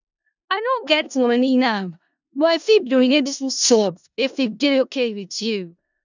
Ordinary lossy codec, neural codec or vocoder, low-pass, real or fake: none; codec, 16 kHz in and 24 kHz out, 0.4 kbps, LongCat-Audio-Codec, four codebook decoder; 7.2 kHz; fake